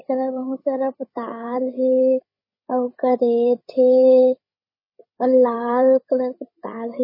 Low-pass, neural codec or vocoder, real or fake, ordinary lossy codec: 5.4 kHz; codec, 16 kHz, 8 kbps, FreqCodec, larger model; fake; MP3, 24 kbps